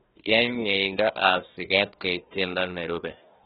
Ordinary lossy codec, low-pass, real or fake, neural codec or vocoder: AAC, 16 kbps; 7.2 kHz; fake; codec, 16 kHz, 1 kbps, FunCodec, trained on Chinese and English, 50 frames a second